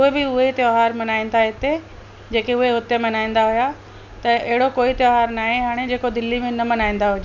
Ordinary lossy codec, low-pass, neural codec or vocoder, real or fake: none; 7.2 kHz; none; real